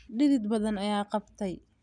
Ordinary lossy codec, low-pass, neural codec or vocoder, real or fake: none; 9.9 kHz; none; real